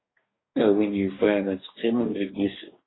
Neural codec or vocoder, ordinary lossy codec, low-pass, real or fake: codec, 44.1 kHz, 2.6 kbps, DAC; AAC, 16 kbps; 7.2 kHz; fake